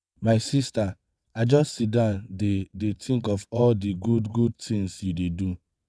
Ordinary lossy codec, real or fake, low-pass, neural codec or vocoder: none; fake; none; vocoder, 22.05 kHz, 80 mel bands, WaveNeXt